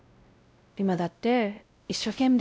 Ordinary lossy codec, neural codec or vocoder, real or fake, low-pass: none; codec, 16 kHz, 0.5 kbps, X-Codec, WavLM features, trained on Multilingual LibriSpeech; fake; none